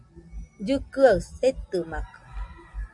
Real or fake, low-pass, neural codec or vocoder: real; 10.8 kHz; none